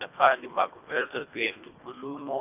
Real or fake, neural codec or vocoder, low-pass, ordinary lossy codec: fake; codec, 24 kHz, 1.5 kbps, HILCodec; 3.6 kHz; none